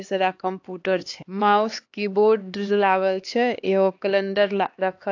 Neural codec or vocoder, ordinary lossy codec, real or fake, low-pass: codec, 16 kHz, 2 kbps, X-Codec, HuBERT features, trained on LibriSpeech; AAC, 48 kbps; fake; 7.2 kHz